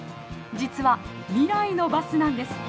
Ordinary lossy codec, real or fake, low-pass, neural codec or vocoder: none; real; none; none